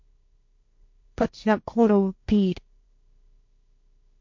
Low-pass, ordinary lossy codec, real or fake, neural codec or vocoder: 7.2 kHz; MP3, 48 kbps; fake; codec, 16 kHz, 1.1 kbps, Voila-Tokenizer